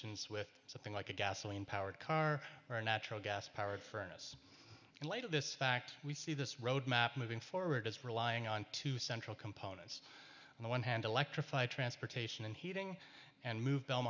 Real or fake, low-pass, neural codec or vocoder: real; 7.2 kHz; none